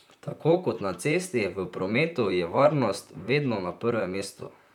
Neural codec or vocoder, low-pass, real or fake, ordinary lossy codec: vocoder, 44.1 kHz, 128 mel bands, Pupu-Vocoder; 19.8 kHz; fake; none